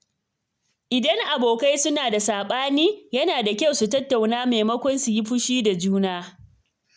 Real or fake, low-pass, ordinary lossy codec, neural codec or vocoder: real; none; none; none